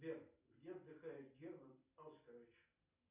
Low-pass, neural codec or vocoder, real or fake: 3.6 kHz; none; real